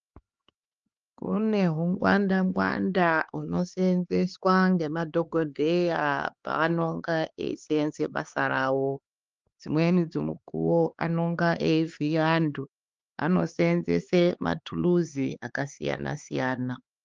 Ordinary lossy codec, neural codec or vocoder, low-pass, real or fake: Opus, 24 kbps; codec, 16 kHz, 4 kbps, X-Codec, HuBERT features, trained on LibriSpeech; 7.2 kHz; fake